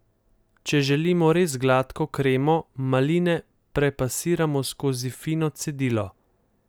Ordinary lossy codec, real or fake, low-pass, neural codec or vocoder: none; real; none; none